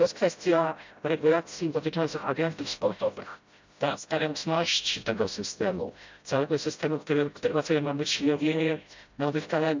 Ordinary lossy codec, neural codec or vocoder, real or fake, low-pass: MP3, 64 kbps; codec, 16 kHz, 0.5 kbps, FreqCodec, smaller model; fake; 7.2 kHz